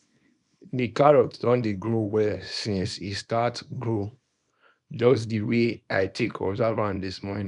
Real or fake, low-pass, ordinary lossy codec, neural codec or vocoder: fake; 10.8 kHz; none; codec, 24 kHz, 0.9 kbps, WavTokenizer, small release